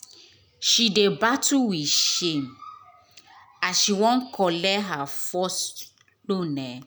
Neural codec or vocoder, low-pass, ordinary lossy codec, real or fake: none; none; none; real